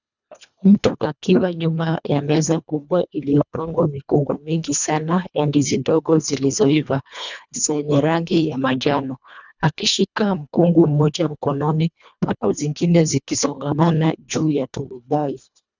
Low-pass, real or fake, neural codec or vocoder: 7.2 kHz; fake; codec, 24 kHz, 1.5 kbps, HILCodec